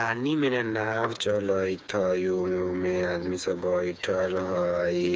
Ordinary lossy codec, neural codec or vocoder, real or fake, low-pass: none; codec, 16 kHz, 4 kbps, FreqCodec, smaller model; fake; none